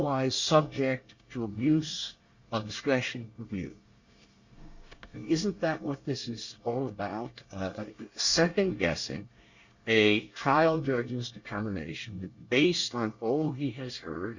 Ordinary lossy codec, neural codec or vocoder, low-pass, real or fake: Opus, 64 kbps; codec, 24 kHz, 1 kbps, SNAC; 7.2 kHz; fake